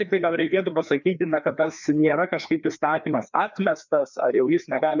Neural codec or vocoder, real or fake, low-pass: codec, 16 kHz, 2 kbps, FreqCodec, larger model; fake; 7.2 kHz